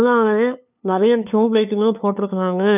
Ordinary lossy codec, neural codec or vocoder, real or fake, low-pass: none; codec, 16 kHz, 2 kbps, FunCodec, trained on LibriTTS, 25 frames a second; fake; 3.6 kHz